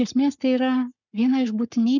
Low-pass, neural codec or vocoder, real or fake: 7.2 kHz; codec, 44.1 kHz, 7.8 kbps, Pupu-Codec; fake